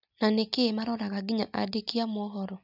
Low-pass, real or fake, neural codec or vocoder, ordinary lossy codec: 5.4 kHz; real; none; none